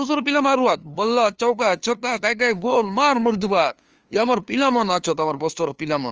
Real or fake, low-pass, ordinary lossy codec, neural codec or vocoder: fake; 7.2 kHz; Opus, 24 kbps; codec, 16 kHz, 2 kbps, FunCodec, trained on LibriTTS, 25 frames a second